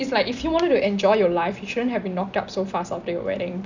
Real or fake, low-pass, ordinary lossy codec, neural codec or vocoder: real; 7.2 kHz; none; none